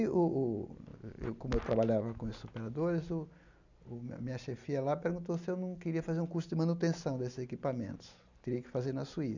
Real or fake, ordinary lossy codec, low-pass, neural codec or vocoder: real; none; 7.2 kHz; none